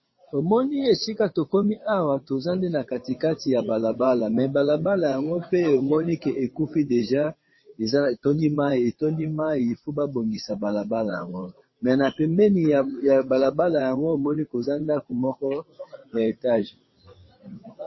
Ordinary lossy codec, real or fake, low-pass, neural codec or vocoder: MP3, 24 kbps; fake; 7.2 kHz; vocoder, 22.05 kHz, 80 mel bands, WaveNeXt